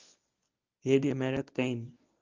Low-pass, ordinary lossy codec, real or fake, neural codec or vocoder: 7.2 kHz; Opus, 24 kbps; fake; codec, 24 kHz, 0.9 kbps, WavTokenizer, medium speech release version 1